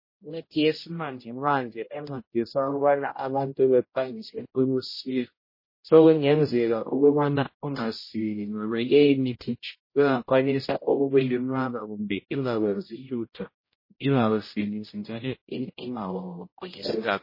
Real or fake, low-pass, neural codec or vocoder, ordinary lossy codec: fake; 5.4 kHz; codec, 16 kHz, 0.5 kbps, X-Codec, HuBERT features, trained on general audio; MP3, 24 kbps